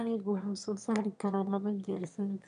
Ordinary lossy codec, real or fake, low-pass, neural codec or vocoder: none; fake; 9.9 kHz; autoencoder, 22.05 kHz, a latent of 192 numbers a frame, VITS, trained on one speaker